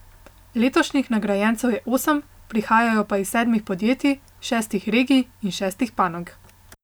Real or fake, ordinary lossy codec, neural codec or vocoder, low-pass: real; none; none; none